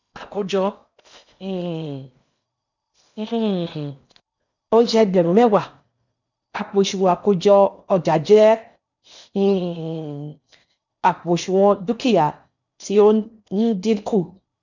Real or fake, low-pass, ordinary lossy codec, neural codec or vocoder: fake; 7.2 kHz; none; codec, 16 kHz in and 24 kHz out, 0.8 kbps, FocalCodec, streaming, 65536 codes